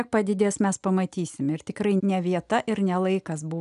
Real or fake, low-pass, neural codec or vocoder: real; 10.8 kHz; none